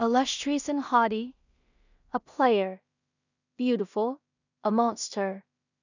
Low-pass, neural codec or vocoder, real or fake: 7.2 kHz; codec, 16 kHz in and 24 kHz out, 0.4 kbps, LongCat-Audio-Codec, two codebook decoder; fake